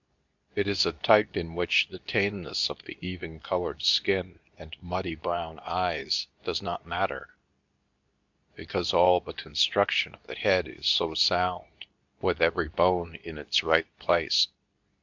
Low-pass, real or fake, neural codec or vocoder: 7.2 kHz; fake; codec, 24 kHz, 0.9 kbps, WavTokenizer, medium speech release version 2